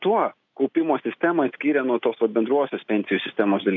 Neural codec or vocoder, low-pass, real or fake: none; 7.2 kHz; real